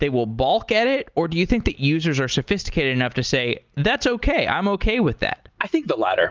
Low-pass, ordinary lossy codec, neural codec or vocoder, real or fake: 7.2 kHz; Opus, 32 kbps; none; real